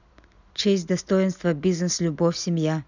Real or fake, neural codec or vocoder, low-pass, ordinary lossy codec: real; none; 7.2 kHz; none